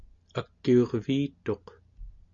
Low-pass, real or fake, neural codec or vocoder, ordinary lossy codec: 7.2 kHz; real; none; Opus, 64 kbps